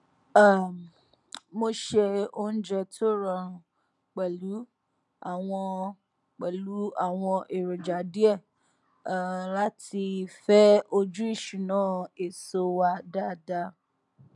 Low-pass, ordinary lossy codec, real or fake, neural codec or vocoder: 10.8 kHz; none; real; none